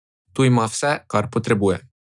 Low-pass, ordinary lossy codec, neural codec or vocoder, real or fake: 10.8 kHz; none; none; real